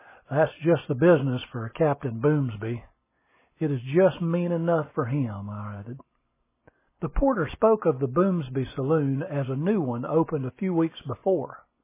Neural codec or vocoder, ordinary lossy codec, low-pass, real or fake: none; MP3, 16 kbps; 3.6 kHz; real